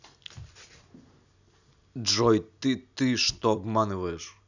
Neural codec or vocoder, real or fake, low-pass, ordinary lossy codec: none; real; 7.2 kHz; none